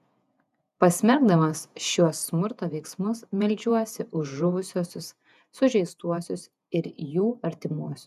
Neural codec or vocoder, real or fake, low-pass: vocoder, 48 kHz, 128 mel bands, Vocos; fake; 14.4 kHz